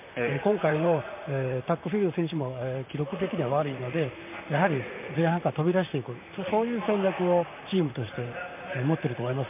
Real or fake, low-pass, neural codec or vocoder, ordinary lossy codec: fake; 3.6 kHz; vocoder, 44.1 kHz, 128 mel bands, Pupu-Vocoder; MP3, 32 kbps